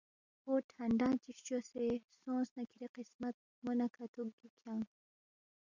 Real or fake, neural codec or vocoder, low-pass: real; none; 7.2 kHz